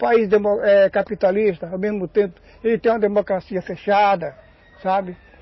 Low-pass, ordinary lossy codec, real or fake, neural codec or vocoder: 7.2 kHz; MP3, 24 kbps; real; none